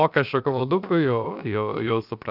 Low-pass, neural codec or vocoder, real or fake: 5.4 kHz; codec, 16 kHz, about 1 kbps, DyCAST, with the encoder's durations; fake